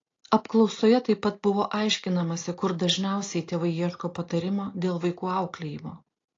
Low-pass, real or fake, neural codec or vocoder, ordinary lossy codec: 7.2 kHz; real; none; AAC, 32 kbps